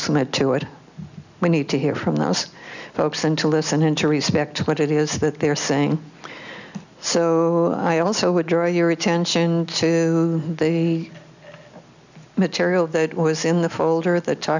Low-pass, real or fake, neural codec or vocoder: 7.2 kHz; real; none